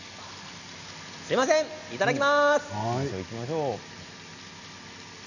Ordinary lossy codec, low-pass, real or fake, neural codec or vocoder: none; 7.2 kHz; real; none